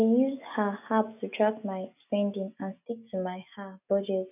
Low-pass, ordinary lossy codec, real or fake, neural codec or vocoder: 3.6 kHz; none; real; none